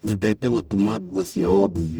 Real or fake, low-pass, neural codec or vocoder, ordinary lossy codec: fake; none; codec, 44.1 kHz, 0.9 kbps, DAC; none